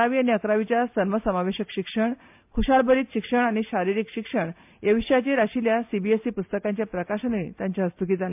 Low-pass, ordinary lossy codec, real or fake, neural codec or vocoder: 3.6 kHz; MP3, 32 kbps; real; none